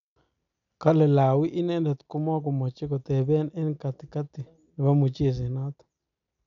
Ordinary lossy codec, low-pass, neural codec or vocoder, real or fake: none; 7.2 kHz; none; real